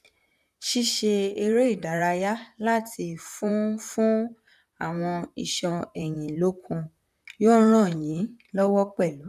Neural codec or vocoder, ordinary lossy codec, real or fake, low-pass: vocoder, 44.1 kHz, 128 mel bands, Pupu-Vocoder; none; fake; 14.4 kHz